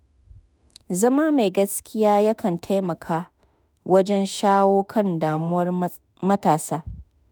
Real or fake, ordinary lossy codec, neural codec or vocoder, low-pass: fake; none; autoencoder, 48 kHz, 32 numbers a frame, DAC-VAE, trained on Japanese speech; none